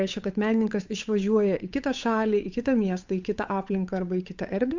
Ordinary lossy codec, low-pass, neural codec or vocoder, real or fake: MP3, 64 kbps; 7.2 kHz; codec, 16 kHz, 8 kbps, FunCodec, trained on Chinese and English, 25 frames a second; fake